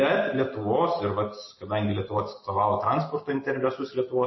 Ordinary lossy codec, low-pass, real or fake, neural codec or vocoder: MP3, 24 kbps; 7.2 kHz; real; none